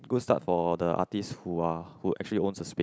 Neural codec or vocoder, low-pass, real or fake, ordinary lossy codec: none; none; real; none